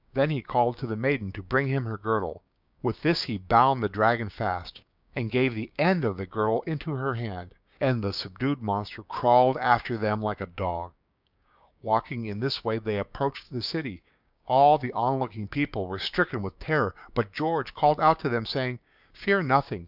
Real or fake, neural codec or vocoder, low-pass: fake; codec, 16 kHz, 6 kbps, DAC; 5.4 kHz